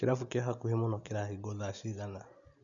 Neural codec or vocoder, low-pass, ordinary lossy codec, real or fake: none; 7.2 kHz; none; real